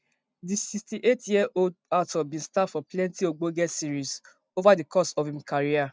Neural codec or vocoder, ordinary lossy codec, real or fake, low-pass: none; none; real; none